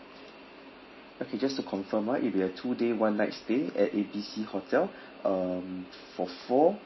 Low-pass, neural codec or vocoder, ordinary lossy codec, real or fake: 7.2 kHz; none; MP3, 24 kbps; real